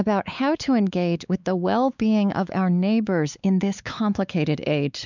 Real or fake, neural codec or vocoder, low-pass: fake; codec, 16 kHz, 4 kbps, X-Codec, WavLM features, trained on Multilingual LibriSpeech; 7.2 kHz